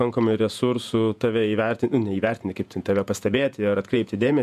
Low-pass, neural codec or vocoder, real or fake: 14.4 kHz; vocoder, 48 kHz, 128 mel bands, Vocos; fake